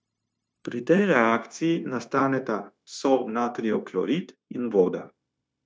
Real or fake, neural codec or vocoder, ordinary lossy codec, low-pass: fake; codec, 16 kHz, 0.9 kbps, LongCat-Audio-Codec; none; none